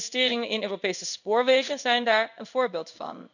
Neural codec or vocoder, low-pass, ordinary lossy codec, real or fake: codec, 16 kHz in and 24 kHz out, 1 kbps, XY-Tokenizer; 7.2 kHz; none; fake